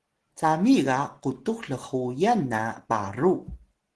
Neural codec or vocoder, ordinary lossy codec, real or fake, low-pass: none; Opus, 16 kbps; real; 10.8 kHz